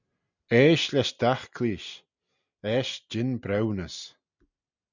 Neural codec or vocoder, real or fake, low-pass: none; real; 7.2 kHz